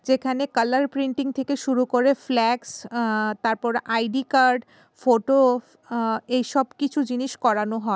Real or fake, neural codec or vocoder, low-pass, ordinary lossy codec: real; none; none; none